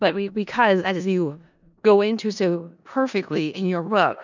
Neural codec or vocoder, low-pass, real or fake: codec, 16 kHz in and 24 kHz out, 0.4 kbps, LongCat-Audio-Codec, four codebook decoder; 7.2 kHz; fake